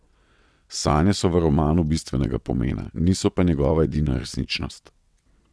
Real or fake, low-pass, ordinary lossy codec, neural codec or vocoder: fake; none; none; vocoder, 22.05 kHz, 80 mel bands, WaveNeXt